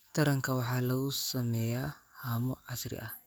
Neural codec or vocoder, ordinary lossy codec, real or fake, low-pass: codec, 44.1 kHz, 7.8 kbps, DAC; none; fake; none